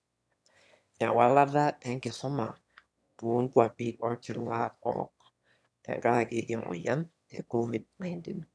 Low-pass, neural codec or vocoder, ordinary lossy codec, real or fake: none; autoencoder, 22.05 kHz, a latent of 192 numbers a frame, VITS, trained on one speaker; none; fake